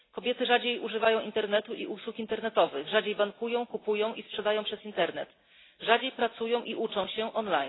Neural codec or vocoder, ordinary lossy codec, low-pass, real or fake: none; AAC, 16 kbps; 7.2 kHz; real